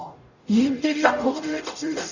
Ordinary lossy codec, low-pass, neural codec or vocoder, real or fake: none; 7.2 kHz; codec, 44.1 kHz, 0.9 kbps, DAC; fake